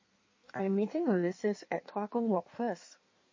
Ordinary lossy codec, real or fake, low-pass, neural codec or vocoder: MP3, 32 kbps; fake; 7.2 kHz; codec, 16 kHz in and 24 kHz out, 1.1 kbps, FireRedTTS-2 codec